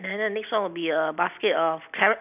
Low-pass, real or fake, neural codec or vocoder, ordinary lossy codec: 3.6 kHz; real; none; none